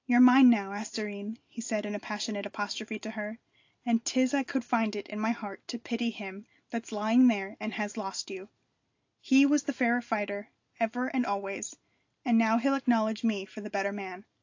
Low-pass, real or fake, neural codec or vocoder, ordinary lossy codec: 7.2 kHz; real; none; AAC, 48 kbps